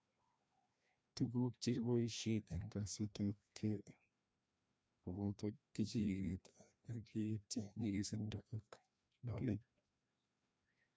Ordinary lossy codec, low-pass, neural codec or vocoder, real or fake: none; none; codec, 16 kHz, 1 kbps, FreqCodec, larger model; fake